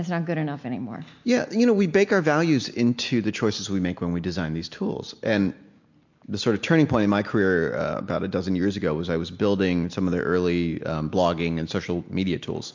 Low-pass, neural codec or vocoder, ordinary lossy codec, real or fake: 7.2 kHz; none; MP3, 48 kbps; real